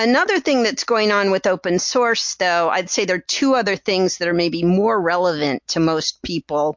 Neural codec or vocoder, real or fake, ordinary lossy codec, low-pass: none; real; MP3, 48 kbps; 7.2 kHz